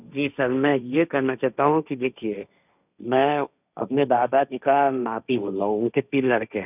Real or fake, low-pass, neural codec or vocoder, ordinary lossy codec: fake; 3.6 kHz; codec, 16 kHz, 1.1 kbps, Voila-Tokenizer; none